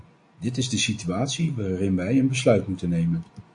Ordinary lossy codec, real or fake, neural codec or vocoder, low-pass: MP3, 48 kbps; real; none; 9.9 kHz